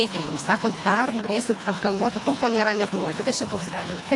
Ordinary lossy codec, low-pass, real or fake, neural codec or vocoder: AAC, 48 kbps; 10.8 kHz; fake; codec, 24 kHz, 1.5 kbps, HILCodec